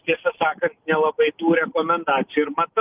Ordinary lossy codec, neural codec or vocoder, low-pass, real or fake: Opus, 32 kbps; none; 3.6 kHz; real